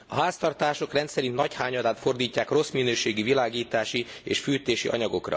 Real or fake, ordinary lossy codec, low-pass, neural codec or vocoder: real; none; none; none